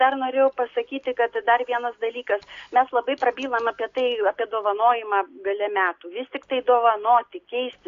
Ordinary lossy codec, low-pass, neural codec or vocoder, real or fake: AAC, 48 kbps; 7.2 kHz; none; real